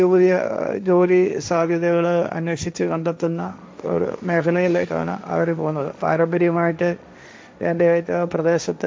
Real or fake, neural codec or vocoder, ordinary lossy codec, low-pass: fake; codec, 16 kHz, 1.1 kbps, Voila-Tokenizer; none; none